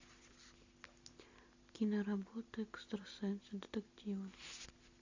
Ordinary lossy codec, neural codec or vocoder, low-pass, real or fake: AAC, 48 kbps; none; 7.2 kHz; real